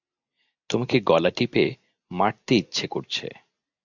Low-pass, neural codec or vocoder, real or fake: 7.2 kHz; none; real